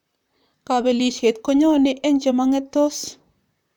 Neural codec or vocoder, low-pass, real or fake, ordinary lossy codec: vocoder, 44.1 kHz, 128 mel bands, Pupu-Vocoder; 19.8 kHz; fake; none